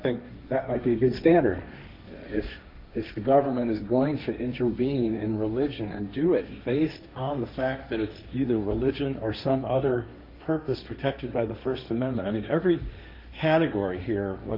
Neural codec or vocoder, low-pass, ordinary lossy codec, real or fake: codec, 16 kHz, 1.1 kbps, Voila-Tokenizer; 5.4 kHz; MP3, 48 kbps; fake